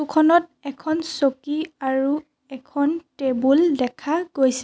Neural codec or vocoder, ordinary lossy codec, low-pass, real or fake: none; none; none; real